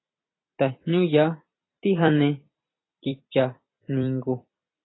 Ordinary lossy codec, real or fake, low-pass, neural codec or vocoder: AAC, 16 kbps; fake; 7.2 kHz; vocoder, 44.1 kHz, 128 mel bands every 256 samples, BigVGAN v2